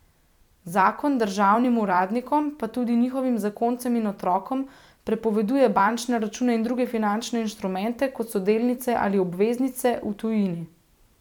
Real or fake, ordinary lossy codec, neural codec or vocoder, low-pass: fake; none; vocoder, 44.1 kHz, 128 mel bands every 256 samples, BigVGAN v2; 19.8 kHz